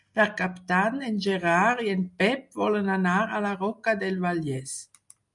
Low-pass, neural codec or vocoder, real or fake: 10.8 kHz; none; real